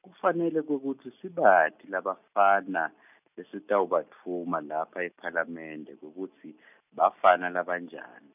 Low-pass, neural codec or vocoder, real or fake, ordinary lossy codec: 3.6 kHz; none; real; none